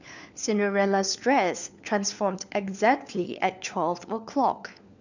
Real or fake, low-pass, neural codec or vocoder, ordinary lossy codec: fake; 7.2 kHz; codec, 16 kHz, 4 kbps, FunCodec, trained on LibriTTS, 50 frames a second; none